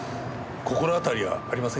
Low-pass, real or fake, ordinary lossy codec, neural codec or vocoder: none; real; none; none